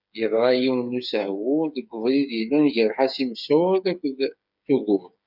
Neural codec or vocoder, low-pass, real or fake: codec, 16 kHz, 8 kbps, FreqCodec, smaller model; 5.4 kHz; fake